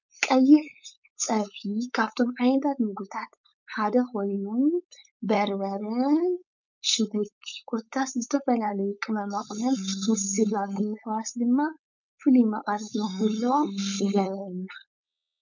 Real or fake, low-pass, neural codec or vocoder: fake; 7.2 kHz; codec, 16 kHz, 4.8 kbps, FACodec